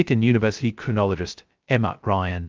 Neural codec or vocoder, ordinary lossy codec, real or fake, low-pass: codec, 16 kHz, 0.2 kbps, FocalCodec; Opus, 32 kbps; fake; 7.2 kHz